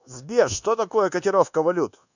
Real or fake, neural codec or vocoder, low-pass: fake; codec, 24 kHz, 1.2 kbps, DualCodec; 7.2 kHz